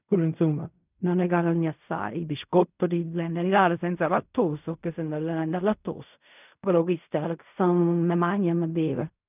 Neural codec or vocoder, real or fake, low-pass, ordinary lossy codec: codec, 16 kHz in and 24 kHz out, 0.4 kbps, LongCat-Audio-Codec, fine tuned four codebook decoder; fake; 3.6 kHz; none